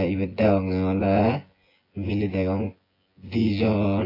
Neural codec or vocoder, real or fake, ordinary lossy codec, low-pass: vocoder, 24 kHz, 100 mel bands, Vocos; fake; AAC, 24 kbps; 5.4 kHz